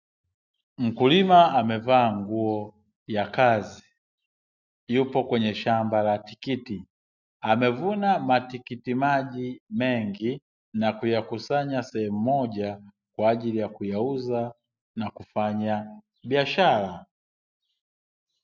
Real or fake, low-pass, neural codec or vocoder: real; 7.2 kHz; none